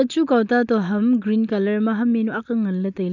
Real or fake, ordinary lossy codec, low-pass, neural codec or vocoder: real; none; 7.2 kHz; none